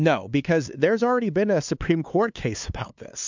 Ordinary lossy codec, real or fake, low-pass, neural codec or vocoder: MP3, 64 kbps; fake; 7.2 kHz; codec, 16 kHz, 2 kbps, FunCodec, trained on LibriTTS, 25 frames a second